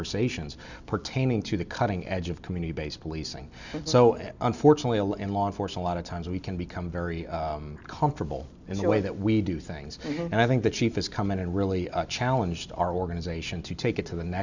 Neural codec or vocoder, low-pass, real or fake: none; 7.2 kHz; real